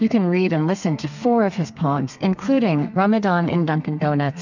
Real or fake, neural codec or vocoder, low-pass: fake; codec, 32 kHz, 1.9 kbps, SNAC; 7.2 kHz